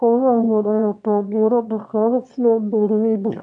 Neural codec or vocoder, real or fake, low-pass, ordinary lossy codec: autoencoder, 22.05 kHz, a latent of 192 numbers a frame, VITS, trained on one speaker; fake; 9.9 kHz; MP3, 64 kbps